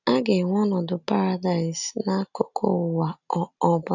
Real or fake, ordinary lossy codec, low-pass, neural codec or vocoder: real; none; 7.2 kHz; none